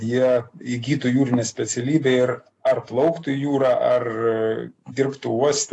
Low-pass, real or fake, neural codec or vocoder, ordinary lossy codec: 10.8 kHz; real; none; AAC, 48 kbps